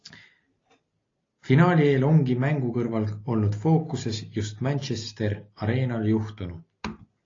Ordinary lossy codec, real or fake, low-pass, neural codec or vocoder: AAC, 32 kbps; real; 7.2 kHz; none